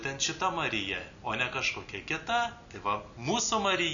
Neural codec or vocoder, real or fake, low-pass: none; real; 7.2 kHz